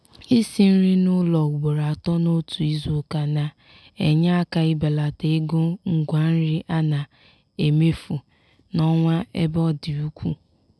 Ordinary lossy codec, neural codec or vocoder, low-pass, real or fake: none; none; none; real